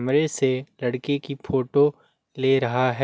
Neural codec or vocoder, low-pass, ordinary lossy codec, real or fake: none; none; none; real